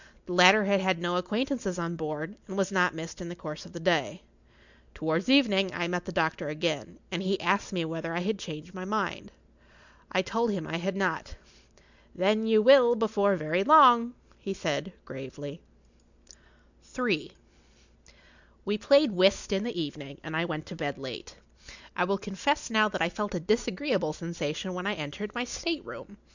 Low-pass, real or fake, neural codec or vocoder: 7.2 kHz; real; none